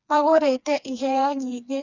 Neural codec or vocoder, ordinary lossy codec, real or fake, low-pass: codec, 16 kHz, 2 kbps, FreqCodec, smaller model; none; fake; 7.2 kHz